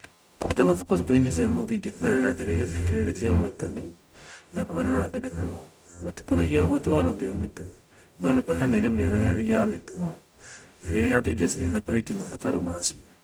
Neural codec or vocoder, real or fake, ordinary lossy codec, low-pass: codec, 44.1 kHz, 0.9 kbps, DAC; fake; none; none